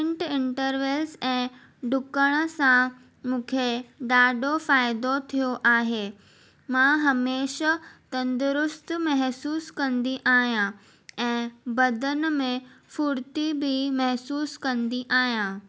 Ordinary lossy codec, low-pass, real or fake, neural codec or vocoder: none; none; real; none